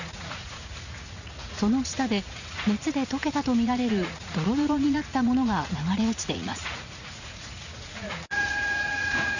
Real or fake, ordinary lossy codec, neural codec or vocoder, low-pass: fake; none; vocoder, 44.1 kHz, 80 mel bands, Vocos; 7.2 kHz